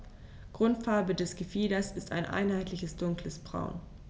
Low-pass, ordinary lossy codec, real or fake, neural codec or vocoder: none; none; real; none